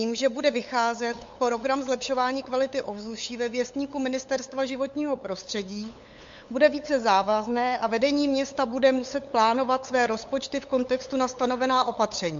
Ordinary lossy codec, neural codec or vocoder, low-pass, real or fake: MP3, 48 kbps; codec, 16 kHz, 8 kbps, FunCodec, trained on LibriTTS, 25 frames a second; 7.2 kHz; fake